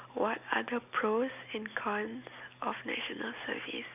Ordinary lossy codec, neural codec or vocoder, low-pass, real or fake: none; none; 3.6 kHz; real